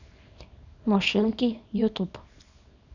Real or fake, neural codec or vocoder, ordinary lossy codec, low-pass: fake; codec, 24 kHz, 0.9 kbps, WavTokenizer, small release; none; 7.2 kHz